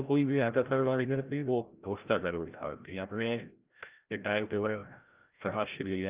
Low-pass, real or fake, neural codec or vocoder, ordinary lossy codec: 3.6 kHz; fake; codec, 16 kHz, 0.5 kbps, FreqCodec, larger model; Opus, 32 kbps